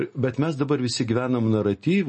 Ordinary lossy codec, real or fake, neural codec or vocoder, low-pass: MP3, 32 kbps; real; none; 10.8 kHz